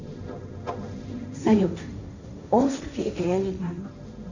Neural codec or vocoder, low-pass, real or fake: codec, 16 kHz, 1.1 kbps, Voila-Tokenizer; 7.2 kHz; fake